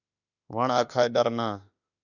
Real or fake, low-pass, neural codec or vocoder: fake; 7.2 kHz; autoencoder, 48 kHz, 32 numbers a frame, DAC-VAE, trained on Japanese speech